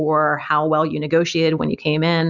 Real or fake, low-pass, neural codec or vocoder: real; 7.2 kHz; none